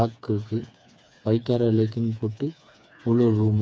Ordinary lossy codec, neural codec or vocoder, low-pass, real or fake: none; codec, 16 kHz, 4 kbps, FreqCodec, smaller model; none; fake